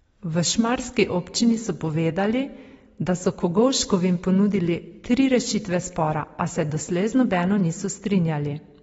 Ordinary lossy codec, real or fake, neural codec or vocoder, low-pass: AAC, 24 kbps; real; none; 19.8 kHz